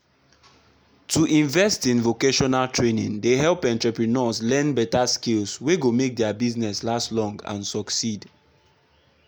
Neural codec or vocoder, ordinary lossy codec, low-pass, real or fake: none; none; none; real